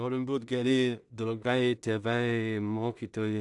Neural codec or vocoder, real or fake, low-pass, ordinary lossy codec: codec, 16 kHz in and 24 kHz out, 0.4 kbps, LongCat-Audio-Codec, two codebook decoder; fake; 10.8 kHz; AAC, 64 kbps